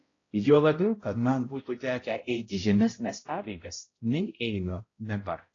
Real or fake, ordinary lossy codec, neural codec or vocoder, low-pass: fake; AAC, 32 kbps; codec, 16 kHz, 0.5 kbps, X-Codec, HuBERT features, trained on balanced general audio; 7.2 kHz